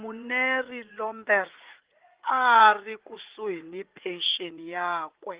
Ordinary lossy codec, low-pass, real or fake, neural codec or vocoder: Opus, 16 kbps; 3.6 kHz; fake; vocoder, 44.1 kHz, 80 mel bands, Vocos